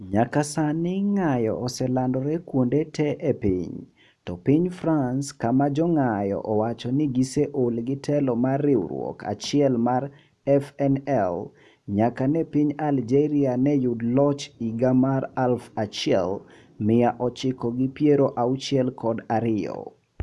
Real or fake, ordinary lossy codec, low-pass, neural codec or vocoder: real; none; none; none